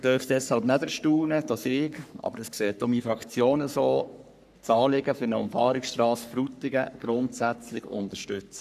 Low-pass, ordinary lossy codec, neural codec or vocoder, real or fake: 14.4 kHz; none; codec, 44.1 kHz, 3.4 kbps, Pupu-Codec; fake